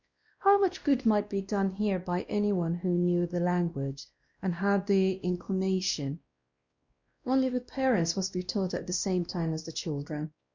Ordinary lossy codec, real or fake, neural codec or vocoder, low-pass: Opus, 64 kbps; fake; codec, 16 kHz, 1 kbps, X-Codec, WavLM features, trained on Multilingual LibriSpeech; 7.2 kHz